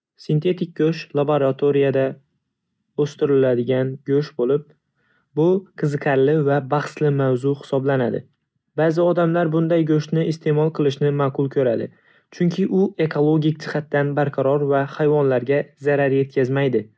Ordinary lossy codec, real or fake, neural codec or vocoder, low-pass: none; real; none; none